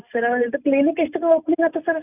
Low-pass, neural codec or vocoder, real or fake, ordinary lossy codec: 3.6 kHz; none; real; none